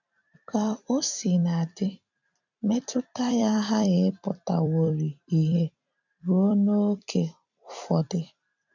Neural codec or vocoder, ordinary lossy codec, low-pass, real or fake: vocoder, 44.1 kHz, 128 mel bands every 256 samples, BigVGAN v2; none; 7.2 kHz; fake